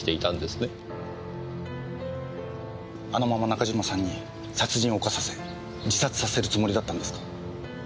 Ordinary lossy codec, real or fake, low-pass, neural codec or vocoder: none; real; none; none